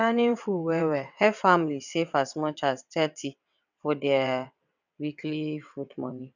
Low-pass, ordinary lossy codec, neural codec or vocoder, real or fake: 7.2 kHz; none; vocoder, 22.05 kHz, 80 mel bands, WaveNeXt; fake